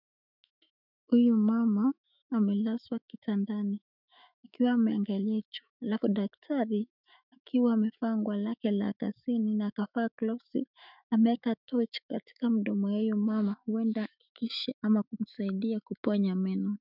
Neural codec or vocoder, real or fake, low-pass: autoencoder, 48 kHz, 128 numbers a frame, DAC-VAE, trained on Japanese speech; fake; 5.4 kHz